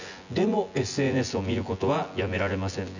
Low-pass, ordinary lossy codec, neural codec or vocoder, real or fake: 7.2 kHz; none; vocoder, 24 kHz, 100 mel bands, Vocos; fake